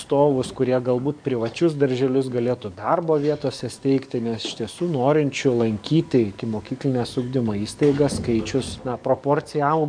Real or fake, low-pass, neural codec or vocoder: fake; 9.9 kHz; codec, 44.1 kHz, 7.8 kbps, DAC